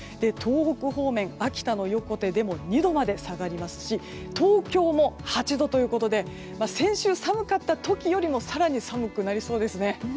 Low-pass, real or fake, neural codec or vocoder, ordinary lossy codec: none; real; none; none